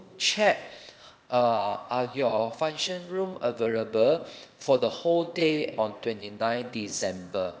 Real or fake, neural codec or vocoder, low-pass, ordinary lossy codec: fake; codec, 16 kHz, 0.8 kbps, ZipCodec; none; none